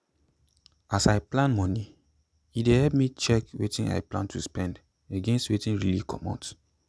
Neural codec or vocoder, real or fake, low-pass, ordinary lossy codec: none; real; none; none